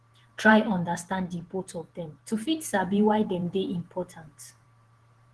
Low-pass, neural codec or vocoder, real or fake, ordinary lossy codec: 10.8 kHz; vocoder, 48 kHz, 128 mel bands, Vocos; fake; Opus, 16 kbps